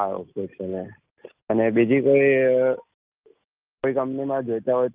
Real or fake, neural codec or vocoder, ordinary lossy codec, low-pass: real; none; Opus, 32 kbps; 3.6 kHz